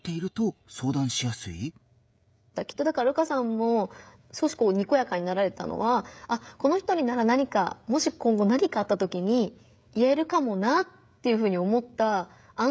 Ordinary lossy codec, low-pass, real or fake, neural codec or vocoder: none; none; fake; codec, 16 kHz, 16 kbps, FreqCodec, smaller model